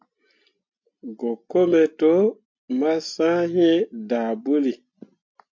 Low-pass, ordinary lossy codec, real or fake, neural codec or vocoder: 7.2 kHz; MP3, 48 kbps; real; none